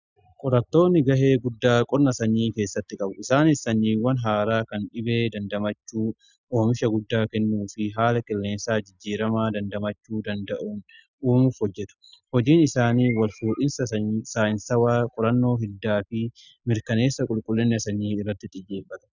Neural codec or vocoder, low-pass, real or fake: none; 7.2 kHz; real